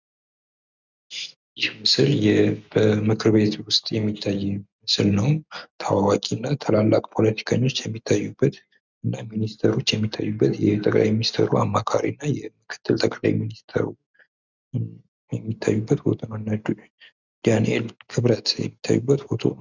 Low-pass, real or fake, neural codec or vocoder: 7.2 kHz; real; none